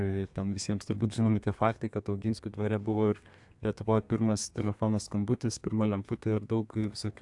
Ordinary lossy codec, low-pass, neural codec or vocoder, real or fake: AAC, 64 kbps; 10.8 kHz; codec, 32 kHz, 1.9 kbps, SNAC; fake